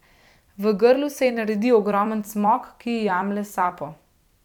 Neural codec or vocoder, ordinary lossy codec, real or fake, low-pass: vocoder, 44.1 kHz, 128 mel bands every 256 samples, BigVGAN v2; none; fake; 19.8 kHz